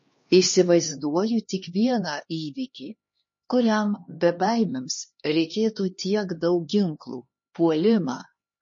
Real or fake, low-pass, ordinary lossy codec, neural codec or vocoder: fake; 7.2 kHz; MP3, 32 kbps; codec, 16 kHz, 2 kbps, X-Codec, HuBERT features, trained on LibriSpeech